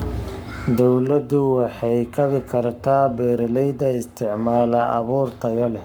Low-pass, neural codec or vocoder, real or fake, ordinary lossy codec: none; codec, 44.1 kHz, 7.8 kbps, Pupu-Codec; fake; none